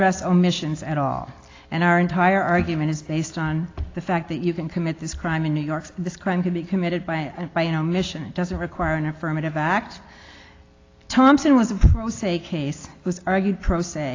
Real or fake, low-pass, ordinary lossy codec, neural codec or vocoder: real; 7.2 kHz; AAC, 32 kbps; none